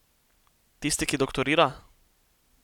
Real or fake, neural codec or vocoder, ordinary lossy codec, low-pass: real; none; none; 19.8 kHz